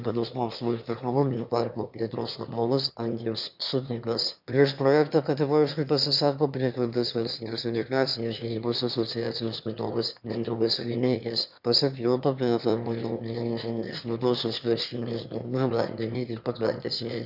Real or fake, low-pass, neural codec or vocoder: fake; 5.4 kHz; autoencoder, 22.05 kHz, a latent of 192 numbers a frame, VITS, trained on one speaker